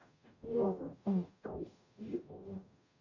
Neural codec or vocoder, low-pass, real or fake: codec, 44.1 kHz, 0.9 kbps, DAC; 7.2 kHz; fake